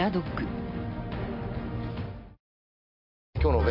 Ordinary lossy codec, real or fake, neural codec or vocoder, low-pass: MP3, 48 kbps; real; none; 5.4 kHz